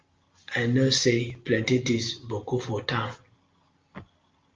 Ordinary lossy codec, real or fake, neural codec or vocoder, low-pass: Opus, 24 kbps; real; none; 7.2 kHz